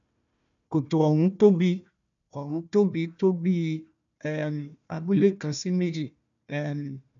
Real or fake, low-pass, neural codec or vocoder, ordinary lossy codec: fake; 7.2 kHz; codec, 16 kHz, 1 kbps, FunCodec, trained on Chinese and English, 50 frames a second; MP3, 64 kbps